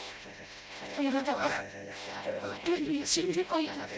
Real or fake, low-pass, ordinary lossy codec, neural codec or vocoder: fake; none; none; codec, 16 kHz, 0.5 kbps, FreqCodec, smaller model